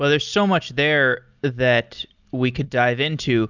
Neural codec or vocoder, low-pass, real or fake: none; 7.2 kHz; real